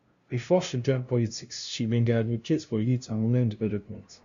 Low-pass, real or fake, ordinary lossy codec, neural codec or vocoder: 7.2 kHz; fake; Opus, 64 kbps; codec, 16 kHz, 0.5 kbps, FunCodec, trained on LibriTTS, 25 frames a second